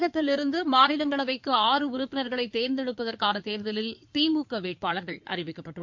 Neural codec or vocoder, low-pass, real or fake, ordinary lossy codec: codec, 16 kHz in and 24 kHz out, 2.2 kbps, FireRedTTS-2 codec; 7.2 kHz; fake; MP3, 48 kbps